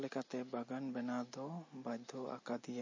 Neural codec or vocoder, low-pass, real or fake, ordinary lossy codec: none; 7.2 kHz; real; MP3, 32 kbps